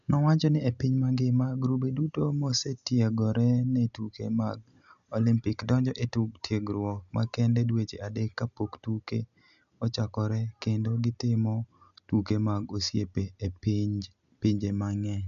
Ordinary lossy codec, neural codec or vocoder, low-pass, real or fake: MP3, 96 kbps; none; 7.2 kHz; real